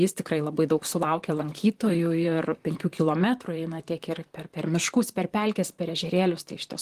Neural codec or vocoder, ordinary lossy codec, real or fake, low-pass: vocoder, 44.1 kHz, 128 mel bands, Pupu-Vocoder; Opus, 24 kbps; fake; 14.4 kHz